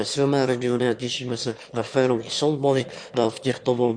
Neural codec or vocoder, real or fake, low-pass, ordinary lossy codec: autoencoder, 22.05 kHz, a latent of 192 numbers a frame, VITS, trained on one speaker; fake; 9.9 kHz; Opus, 64 kbps